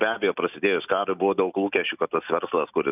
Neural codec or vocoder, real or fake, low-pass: none; real; 3.6 kHz